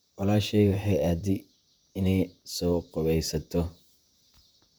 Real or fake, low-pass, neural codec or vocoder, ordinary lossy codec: fake; none; vocoder, 44.1 kHz, 128 mel bands, Pupu-Vocoder; none